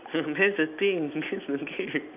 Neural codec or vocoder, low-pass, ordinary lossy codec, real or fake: none; 3.6 kHz; none; real